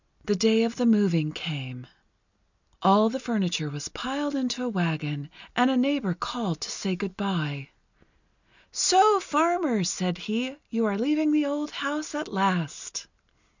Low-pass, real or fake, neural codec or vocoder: 7.2 kHz; real; none